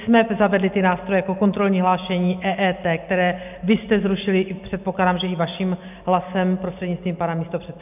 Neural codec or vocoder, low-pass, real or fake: none; 3.6 kHz; real